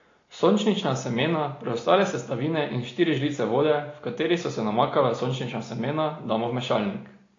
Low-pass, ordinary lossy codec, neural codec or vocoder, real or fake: 7.2 kHz; AAC, 32 kbps; none; real